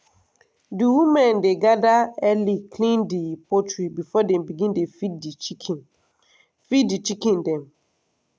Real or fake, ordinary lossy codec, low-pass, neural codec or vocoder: real; none; none; none